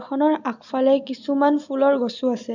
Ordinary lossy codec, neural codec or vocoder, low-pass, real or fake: none; none; 7.2 kHz; real